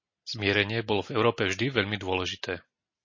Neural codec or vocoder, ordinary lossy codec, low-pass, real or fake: none; MP3, 32 kbps; 7.2 kHz; real